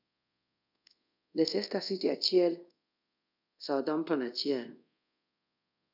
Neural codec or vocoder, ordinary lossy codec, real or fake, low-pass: codec, 24 kHz, 0.5 kbps, DualCodec; none; fake; 5.4 kHz